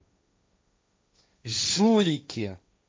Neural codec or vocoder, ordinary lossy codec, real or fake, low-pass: codec, 16 kHz, 1.1 kbps, Voila-Tokenizer; none; fake; none